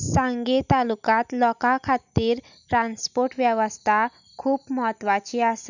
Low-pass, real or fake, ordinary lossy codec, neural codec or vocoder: 7.2 kHz; real; none; none